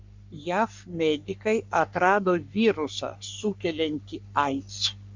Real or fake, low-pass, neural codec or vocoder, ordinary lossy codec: fake; 7.2 kHz; codec, 44.1 kHz, 3.4 kbps, Pupu-Codec; MP3, 64 kbps